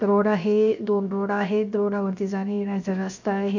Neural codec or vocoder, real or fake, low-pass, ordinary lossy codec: codec, 16 kHz, 0.7 kbps, FocalCodec; fake; 7.2 kHz; none